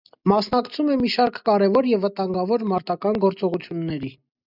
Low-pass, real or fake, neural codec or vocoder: 5.4 kHz; real; none